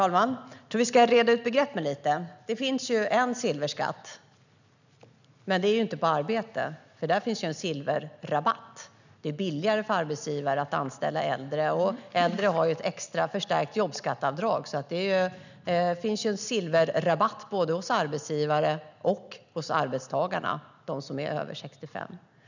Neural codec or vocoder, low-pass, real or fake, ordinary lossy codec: none; 7.2 kHz; real; none